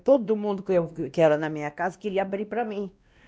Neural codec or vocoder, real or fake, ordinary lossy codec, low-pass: codec, 16 kHz, 1 kbps, X-Codec, WavLM features, trained on Multilingual LibriSpeech; fake; none; none